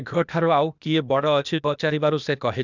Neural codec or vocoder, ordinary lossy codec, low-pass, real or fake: codec, 16 kHz, 0.8 kbps, ZipCodec; none; 7.2 kHz; fake